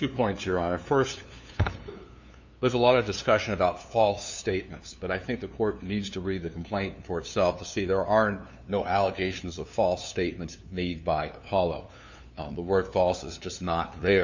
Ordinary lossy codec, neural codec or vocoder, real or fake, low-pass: MP3, 64 kbps; codec, 16 kHz, 2 kbps, FunCodec, trained on LibriTTS, 25 frames a second; fake; 7.2 kHz